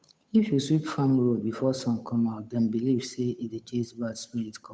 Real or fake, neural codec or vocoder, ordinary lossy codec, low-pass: fake; codec, 16 kHz, 8 kbps, FunCodec, trained on Chinese and English, 25 frames a second; none; none